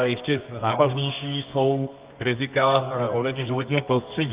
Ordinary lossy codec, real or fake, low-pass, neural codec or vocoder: Opus, 32 kbps; fake; 3.6 kHz; codec, 24 kHz, 0.9 kbps, WavTokenizer, medium music audio release